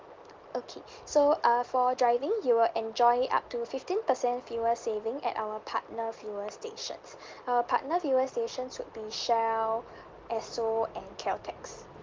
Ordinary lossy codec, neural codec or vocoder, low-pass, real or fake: Opus, 32 kbps; none; 7.2 kHz; real